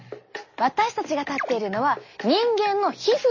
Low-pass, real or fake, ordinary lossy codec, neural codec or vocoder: 7.2 kHz; real; MP3, 32 kbps; none